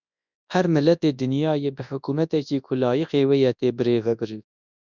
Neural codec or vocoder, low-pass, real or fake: codec, 24 kHz, 0.9 kbps, WavTokenizer, large speech release; 7.2 kHz; fake